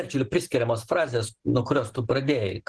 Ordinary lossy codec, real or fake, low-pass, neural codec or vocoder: Opus, 16 kbps; real; 10.8 kHz; none